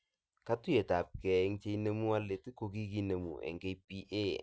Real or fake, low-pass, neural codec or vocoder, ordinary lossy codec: real; none; none; none